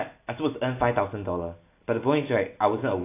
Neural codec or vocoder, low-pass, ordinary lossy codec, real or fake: none; 3.6 kHz; AAC, 24 kbps; real